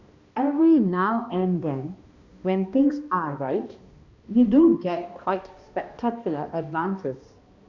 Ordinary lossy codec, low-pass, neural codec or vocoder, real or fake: Opus, 64 kbps; 7.2 kHz; codec, 16 kHz, 1 kbps, X-Codec, HuBERT features, trained on balanced general audio; fake